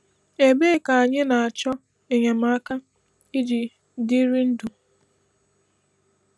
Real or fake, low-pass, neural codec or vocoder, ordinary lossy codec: real; none; none; none